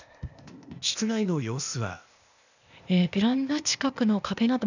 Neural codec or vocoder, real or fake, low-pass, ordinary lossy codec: codec, 16 kHz, 0.8 kbps, ZipCodec; fake; 7.2 kHz; none